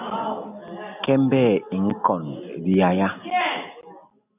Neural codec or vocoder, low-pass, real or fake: none; 3.6 kHz; real